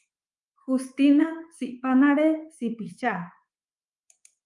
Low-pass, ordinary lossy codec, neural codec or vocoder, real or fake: 10.8 kHz; Opus, 32 kbps; codec, 24 kHz, 3.1 kbps, DualCodec; fake